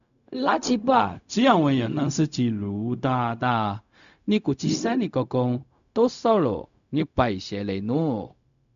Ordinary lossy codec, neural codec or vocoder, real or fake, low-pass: MP3, 96 kbps; codec, 16 kHz, 0.4 kbps, LongCat-Audio-Codec; fake; 7.2 kHz